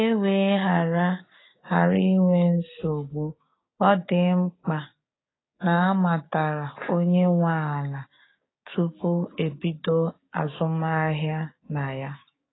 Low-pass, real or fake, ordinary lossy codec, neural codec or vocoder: 7.2 kHz; fake; AAC, 16 kbps; codec, 16 kHz, 6 kbps, DAC